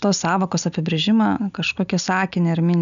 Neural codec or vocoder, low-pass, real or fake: none; 7.2 kHz; real